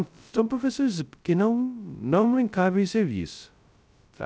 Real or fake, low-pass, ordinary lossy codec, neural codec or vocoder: fake; none; none; codec, 16 kHz, 0.2 kbps, FocalCodec